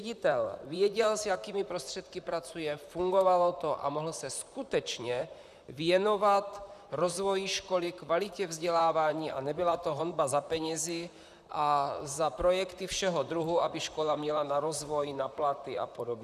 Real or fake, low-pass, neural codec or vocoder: fake; 14.4 kHz; vocoder, 44.1 kHz, 128 mel bands, Pupu-Vocoder